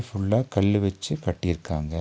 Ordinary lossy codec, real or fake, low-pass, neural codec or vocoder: none; real; none; none